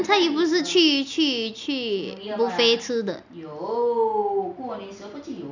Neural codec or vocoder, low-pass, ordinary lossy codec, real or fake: none; 7.2 kHz; none; real